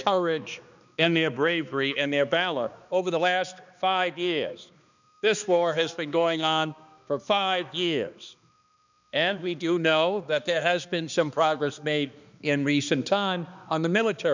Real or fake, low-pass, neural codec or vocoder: fake; 7.2 kHz; codec, 16 kHz, 2 kbps, X-Codec, HuBERT features, trained on balanced general audio